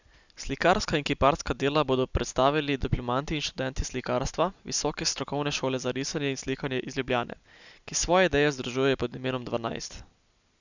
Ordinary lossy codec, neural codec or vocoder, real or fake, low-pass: none; none; real; 7.2 kHz